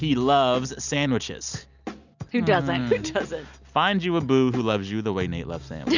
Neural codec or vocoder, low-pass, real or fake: none; 7.2 kHz; real